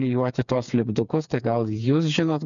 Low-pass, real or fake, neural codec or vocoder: 7.2 kHz; fake; codec, 16 kHz, 4 kbps, FreqCodec, smaller model